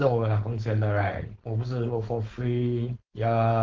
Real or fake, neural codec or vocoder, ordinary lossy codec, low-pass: fake; codec, 16 kHz, 4.8 kbps, FACodec; Opus, 16 kbps; 7.2 kHz